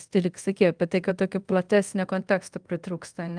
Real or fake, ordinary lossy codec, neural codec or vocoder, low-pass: fake; Opus, 32 kbps; codec, 24 kHz, 0.5 kbps, DualCodec; 9.9 kHz